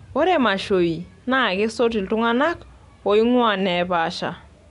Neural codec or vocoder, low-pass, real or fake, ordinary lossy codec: none; 10.8 kHz; real; none